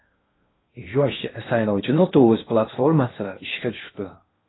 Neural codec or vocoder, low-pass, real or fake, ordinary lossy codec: codec, 16 kHz in and 24 kHz out, 0.8 kbps, FocalCodec, streaming, 65536 codes; 7.2 kHz; fake; AAC, 16 kbps